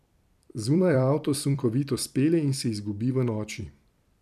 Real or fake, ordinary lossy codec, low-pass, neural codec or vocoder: fake; none; 14.4 kHz; vocoder, 44.1 kHz, 128 mel bands every 256 samples, BigVGAN v2